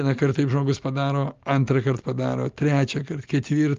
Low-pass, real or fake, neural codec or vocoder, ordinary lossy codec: 7.2 kHz; real; none; Opus, 32 kbps